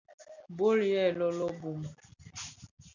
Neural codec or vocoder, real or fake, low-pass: none; real; 7.2 kHz